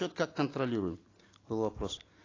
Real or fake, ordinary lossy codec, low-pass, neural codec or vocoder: real; AAC, 32 kbps; 7.2 kHz; none